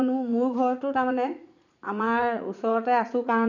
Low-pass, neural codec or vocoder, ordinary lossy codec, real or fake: 7.2 kHz; vocoder, 44.1 kHz, 80 mel bands, Vocos; none; fake